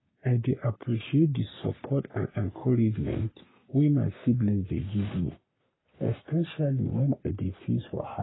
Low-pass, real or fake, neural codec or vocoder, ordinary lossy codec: 7.2 kHz; fake; codec, 44.1 kHz, 3.4 kbps, Pupu-Codec; AAC, 16 kbps